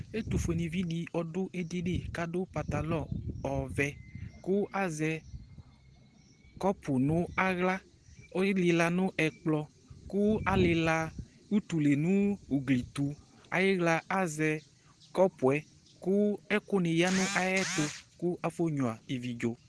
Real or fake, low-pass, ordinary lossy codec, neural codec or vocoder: real; 10.8 kHz; Opus, 16 kbps; none